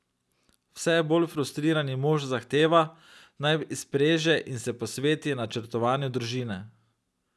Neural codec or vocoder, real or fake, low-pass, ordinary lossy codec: none; real; none; none